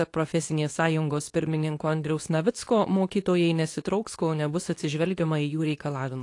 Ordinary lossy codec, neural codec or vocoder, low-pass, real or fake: AAC, 48 kbps; codec, 24 kHz, 0.9 kbps, WavTokenizer, medium speech release version 2; 10.8 kHz; fake